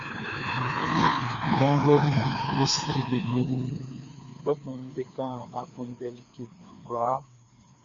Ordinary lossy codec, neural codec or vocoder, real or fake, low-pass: Opus, 64 kbps; codec, 16 kHz, 2 kbps, FreqCodec, larger model; fake; 7.2 kHz